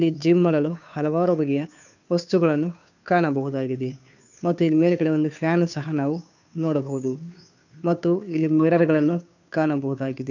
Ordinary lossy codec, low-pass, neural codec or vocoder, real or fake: none; 7.2 kHz; codec, 16 kHz, 2 kbps, FunCodec, trained on Chinese and English, 25 frames a second; fake